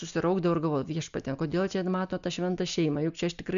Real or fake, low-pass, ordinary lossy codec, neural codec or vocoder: real; 7.2 kHz; MP3, 96 kbps; none